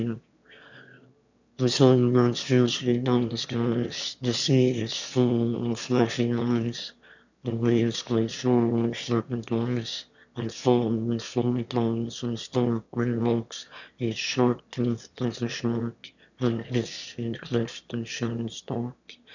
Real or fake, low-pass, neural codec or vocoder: fake; 7.2 kHz; autoencoder, 22.05 kHz, a latent of 192 numbers a frame, VITS, trained on one speaker